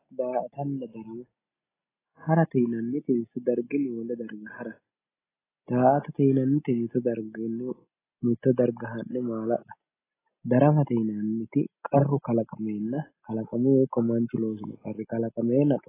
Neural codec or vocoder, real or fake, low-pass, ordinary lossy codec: none; real; 3.6 kHz; AAC, 16 kbps